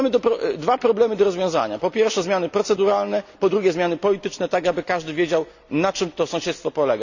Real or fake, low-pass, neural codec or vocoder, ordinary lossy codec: real; 7.2 kHz; none; none